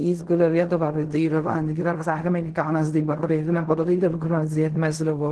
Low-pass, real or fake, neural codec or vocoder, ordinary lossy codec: 10.8 kHz; fake; codec, 16 kHz in and 24 kHz out, 0.4 kbps, LongCat-Audio-Codec, fine tuned four codebook decoder; Opus, 16 kbps